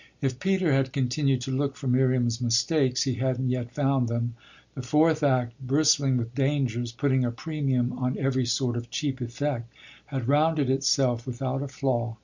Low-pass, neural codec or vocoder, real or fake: 7.2 kHz; none; real